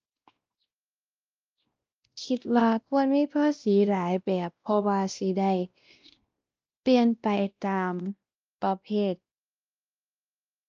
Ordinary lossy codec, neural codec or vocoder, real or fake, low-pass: Opus, 24 kbps; codec, 16 kHz, 1 kbps, X-Codec, WavLM features, trained on Multilingual LibriSpeech; fake; 7.2 kHz